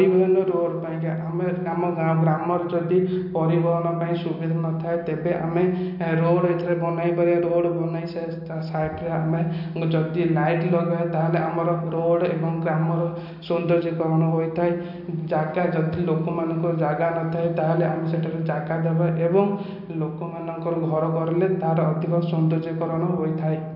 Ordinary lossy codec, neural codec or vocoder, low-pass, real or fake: none; none; 5.4 kHz; real